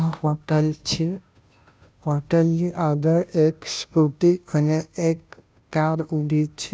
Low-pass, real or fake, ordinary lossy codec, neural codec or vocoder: none; fake; none; codec, 16 kHz, 0.5 kbps, FunCodec, trained on Chinese and English, 25 frames a second